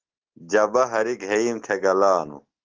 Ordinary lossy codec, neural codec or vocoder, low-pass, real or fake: Opus, 16 kbps; none; 7.2 kHz; real